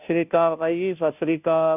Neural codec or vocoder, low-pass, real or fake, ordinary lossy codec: codec, 16 kHz, 0.5 kbps, FunCodec, trained on Chinese and English, 25 frames a second; 3.6 kHz; fake; none